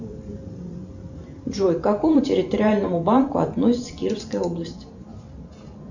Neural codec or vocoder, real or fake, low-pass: vocoder, 44.1 kHz, 128 mel bands every 512 samples, BigVGAN v2; fake; 7.2 kHz